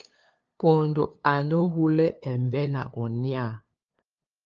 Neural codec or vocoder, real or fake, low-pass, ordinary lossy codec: codec, 16 kHz, 2 kbps, FunCodec, trained on LibriTTS, 25 frames a second; fake; 7.2 kHz; Opus, 24 kbps